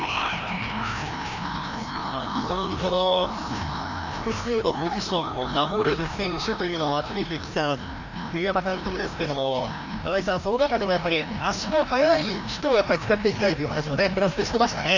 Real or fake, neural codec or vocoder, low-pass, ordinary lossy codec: fake; codec, 16 kHz, 1 kbps, FreqCodec, larger model; 7.2 kHz; none